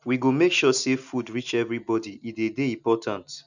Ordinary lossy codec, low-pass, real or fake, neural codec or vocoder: none; 7.2 kHz; real; none